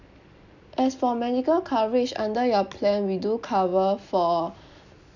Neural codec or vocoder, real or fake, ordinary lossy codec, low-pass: none; real; none; 7.2 kHz